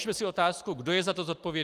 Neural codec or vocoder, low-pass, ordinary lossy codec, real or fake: vocoder, 44.1 kHz, 128 mel bands every 256 samples, BigVGAN v2; 14.4 kHz; Opus, 32 kbps; fake